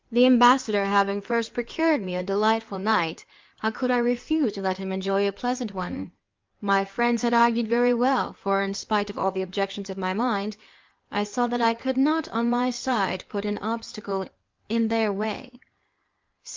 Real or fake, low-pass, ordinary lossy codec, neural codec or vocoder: fake; 7.2 kHz; Opus, 24 kbps; codec, 16 kHz in and 24 kHz out, 2.2 kbps, FireRedTTS-2 codec